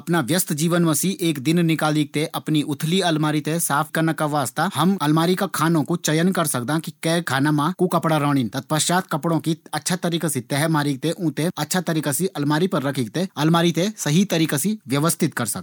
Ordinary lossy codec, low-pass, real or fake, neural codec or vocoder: none; none; real; none